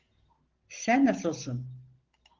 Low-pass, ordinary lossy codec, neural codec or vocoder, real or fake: 7.2 kHz; Opus, 16 kbps; none; real